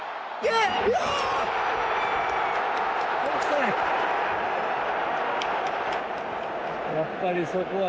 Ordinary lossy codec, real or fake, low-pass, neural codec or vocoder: none; real; none; none